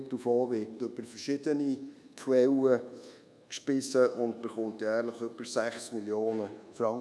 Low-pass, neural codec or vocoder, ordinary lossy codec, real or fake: none; codec, 24 kHz, 1.2 kbps, DualCodec; none; fake